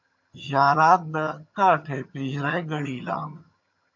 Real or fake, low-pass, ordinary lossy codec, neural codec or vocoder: fake; 7.2 kHz; MP3, 48 kbps; vocoder, 22.05 kHz, 80 mel bands, HiFi-GAN